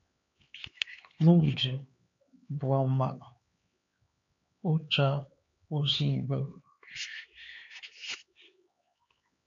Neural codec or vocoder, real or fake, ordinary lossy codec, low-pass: codec, 16 kHz, 4 kbps, X-Codec, HuBERT features, trained on LibriSpeech; fake; MP3, 48 kbps; 7.2 kHz